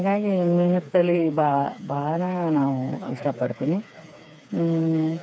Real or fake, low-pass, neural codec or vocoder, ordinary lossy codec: fake; none; codec, 16 kHz, 4 kbps, FreqCodec, smaller model; none